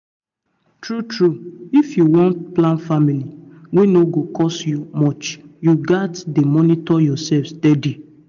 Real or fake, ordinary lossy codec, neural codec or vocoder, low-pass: real; none; none; 7.2 kHz